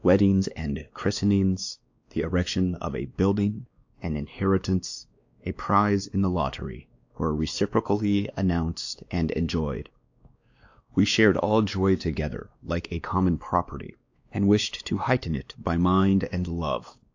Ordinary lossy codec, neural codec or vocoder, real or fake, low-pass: AAC, 48 kbps; codec, 16 kHz, 2 kbps, X-Codec, WavLM features, trained on Multilingual LibriSpeech; fake; 7.2 kHz